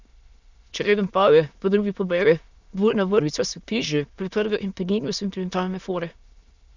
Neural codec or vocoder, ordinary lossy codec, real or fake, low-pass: autoencoder, 22.05 kHz, a latent of 192 numbers a frame, VITS, trained on many speakers; Opus, 64 kbps; fake; 7.2 kHz